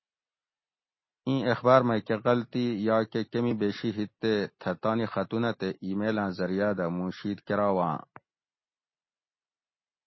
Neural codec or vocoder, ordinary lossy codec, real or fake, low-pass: none; MP3, 24 kbps; real; 7.2 kHz